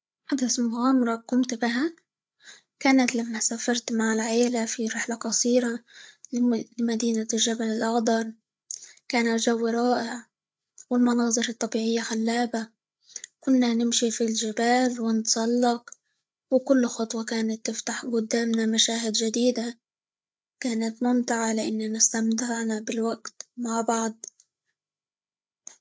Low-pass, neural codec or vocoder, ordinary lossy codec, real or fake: none; codec, 16 kHz, 8 kbps, FreqCodec, larger model; none; fake